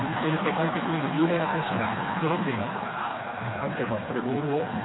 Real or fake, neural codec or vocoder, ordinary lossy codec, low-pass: fake; codec, 16 kHz, 2 kbps, FreqCodec, smaller model; AAC, 16 kbps; 7.2 kHz